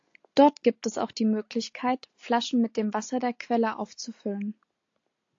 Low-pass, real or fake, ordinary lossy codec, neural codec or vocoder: 7.2 kHz; real; AAC, 48 kbps; none